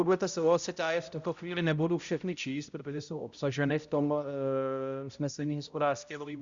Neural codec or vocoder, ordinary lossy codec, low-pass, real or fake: codec, 16 kHz, 0.5 kbps, X-Codec, HuBERT features, trained on balanced general audio; Opus, 64 kbps; 7.2 kHz; fake